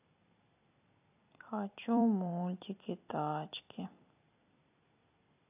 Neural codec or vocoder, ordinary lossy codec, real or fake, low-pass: vocoder, 44.1 kHz, 128 mel bands every 256 samples, BigVGAN v2; AAC, 32 kbps; fake; 3.6 kHz